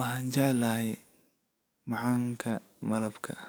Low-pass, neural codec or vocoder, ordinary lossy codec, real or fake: none; codec, 44.1 kHz, 7.8 kbps, DAC; none; fake